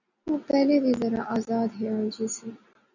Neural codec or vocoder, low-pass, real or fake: none; 7.2 kHz; real